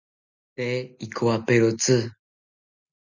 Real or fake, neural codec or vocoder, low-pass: real; none; 7.2 kHz